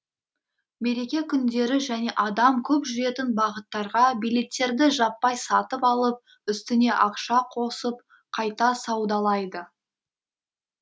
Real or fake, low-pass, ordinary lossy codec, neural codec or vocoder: real; none; none; none